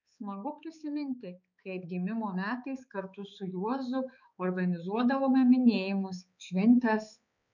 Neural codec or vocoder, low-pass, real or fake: codec, 16 kHz, 4 kbps, X-Codec, HuBERT features, trained on balanced general audio; 7.2 kHz; fake